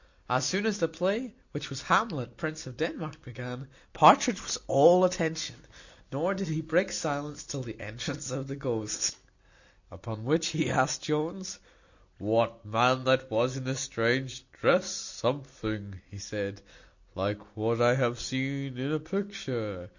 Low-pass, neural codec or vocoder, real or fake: 7.2 kHz; none; real